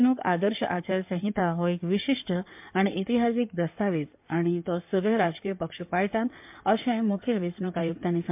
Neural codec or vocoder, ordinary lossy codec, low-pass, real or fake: codec, 16 kHz in and 24 kHz out, 2.2 kbps, FireRedTTS-2 codec; MP3, 32 kbps; 3.6 kHz; fake